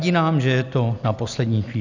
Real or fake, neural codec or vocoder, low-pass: real; none; 7.2 kHz